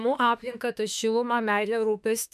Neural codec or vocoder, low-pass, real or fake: autoencoder, 48 kHz, 32 numbers a frame, DAC-VAE, trained on Japanese speech; 14.4 kHz; fake